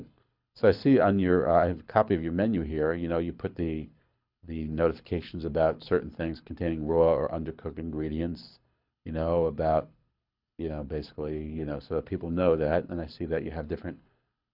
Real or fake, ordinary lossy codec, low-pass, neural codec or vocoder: fake; MP3, 48 kbps; 5.4 kHz; codec, 24 kHz, 6 kbps, HILCodec